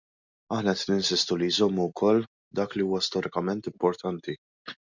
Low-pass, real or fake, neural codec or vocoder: 7.2 kHz; real; none